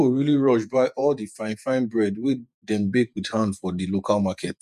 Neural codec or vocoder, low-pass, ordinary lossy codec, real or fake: vocoder, 48 kHz, 128 mel bands, Vocos; 14.4 kHz; none; fake